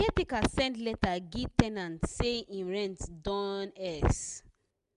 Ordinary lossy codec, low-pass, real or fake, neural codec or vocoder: none; 10.8 kHz; real; none